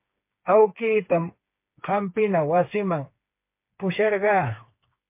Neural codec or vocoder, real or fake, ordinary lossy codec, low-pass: codec, 16 kHz, 4 kbps, FreqCodec, smaller model; fake; MP3, 24 kbps; 3.6 kHz